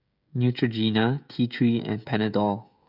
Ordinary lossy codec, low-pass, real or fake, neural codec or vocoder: none; 5.4 kHz; fake; codec, 16 kHz, 16 kbps, FreqCodec, smaller model